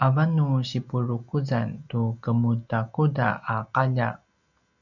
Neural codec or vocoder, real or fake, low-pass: none; real; 7.2 kHz